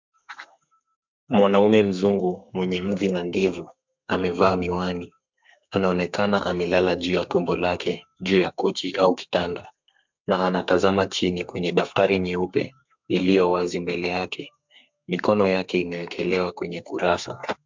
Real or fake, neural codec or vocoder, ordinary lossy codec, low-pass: fake; codec, 32 kHz, 1.9 kbps, SNAC; MP3, 64 kbps; 7.2 kHz